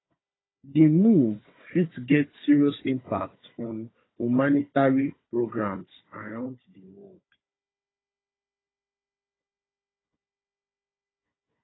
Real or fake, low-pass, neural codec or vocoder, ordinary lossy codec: fake; 7.2 kHz; codec, 16 kHz, 4 kbps, FunCodec, trained on Chinese and English, 50 frames a second; AAC, 16 kbps